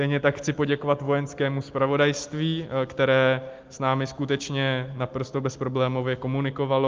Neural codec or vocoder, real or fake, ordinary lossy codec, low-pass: none; real; Opus, 24 kbps; 7.2 kHz